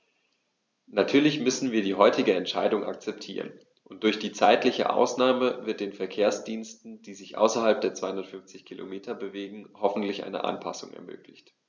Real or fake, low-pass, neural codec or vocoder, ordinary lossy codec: real; none; none; none